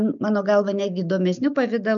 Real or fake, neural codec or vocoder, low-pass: real; none; 7.2 kHz